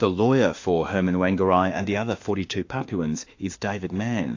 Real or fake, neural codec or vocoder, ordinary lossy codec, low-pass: fake; autoencoder, 48 kHz, 32 numbers a frame, DAC-VAE, trained on Japanese speech; AAC, 48 kbps; 7.2 kHz